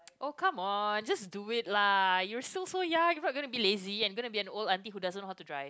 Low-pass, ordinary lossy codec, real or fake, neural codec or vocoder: none; none; real; none